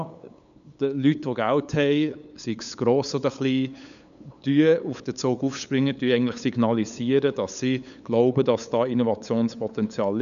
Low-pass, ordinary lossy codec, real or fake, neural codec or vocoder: 7.2 kHz; none; fake; codec, 16 kHz, 8 kbps, FunCodec, trained on LibriTTS, 25 frames a second